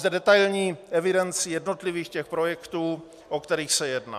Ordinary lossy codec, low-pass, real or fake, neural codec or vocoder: MP3, 96 kbps; 14.4 kHz; real; none